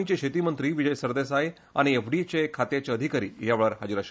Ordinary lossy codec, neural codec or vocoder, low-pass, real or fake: none; none; none; real